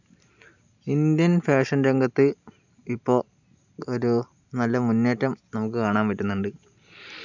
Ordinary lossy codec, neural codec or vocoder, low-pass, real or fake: none; none; 7.2 kHz; real